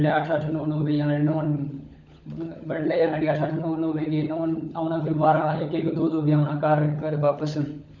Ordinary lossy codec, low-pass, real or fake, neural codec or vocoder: none; 7.2 kHz; fake; codec, 16 kHz, 16 kbps, FunCodec, trained on LibriTTS, 50 frames a second